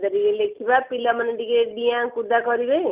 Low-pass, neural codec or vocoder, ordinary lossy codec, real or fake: 3.6 kHz; none; Opus, 32 kbps; real